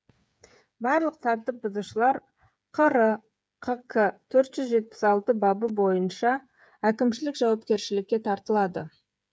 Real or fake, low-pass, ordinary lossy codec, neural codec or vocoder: fake; none; none; codec, 16 kHz, 8 kbps, FreqCodec, smaller model